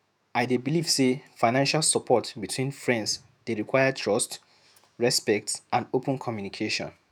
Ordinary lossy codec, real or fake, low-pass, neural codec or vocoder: none; fake; none; autoencoder, 48 kHz, 128 numbers a frame, DAC-VAE, trained on Japanese speech